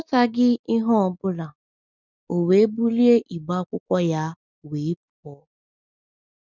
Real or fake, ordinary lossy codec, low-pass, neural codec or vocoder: real; none; 7.2 kHz; none